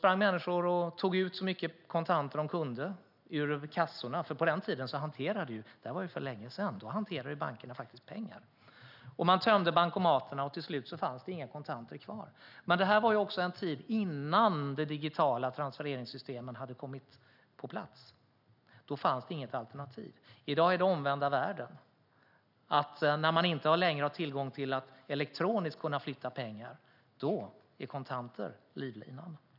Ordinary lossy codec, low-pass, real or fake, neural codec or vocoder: none; 5.4 kHz; real; none